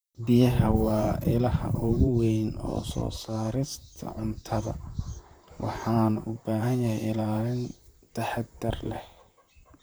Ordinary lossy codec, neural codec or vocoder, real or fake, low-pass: none; vocoder, 44.1 kHz, 128 mel bands, Pupu-Vocoder; fake; none